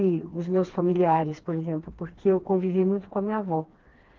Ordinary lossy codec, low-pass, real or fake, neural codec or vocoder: Opus, 16 kbps; 7.2 kHz; fake; codec, 16 kHz, 2 kbps, FreqCodec, smaller model